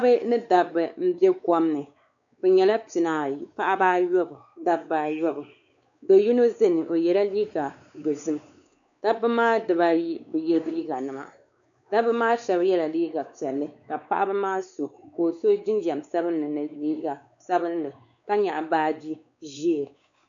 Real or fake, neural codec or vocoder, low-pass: fake; codec, 16 kHz, 4 kbps, X-Codec, WavLM features, trained on Multilingual LibriSpeech; 7.2 kHz